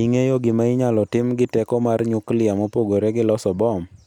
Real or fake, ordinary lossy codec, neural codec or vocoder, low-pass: real; none; none; 19.8 kHz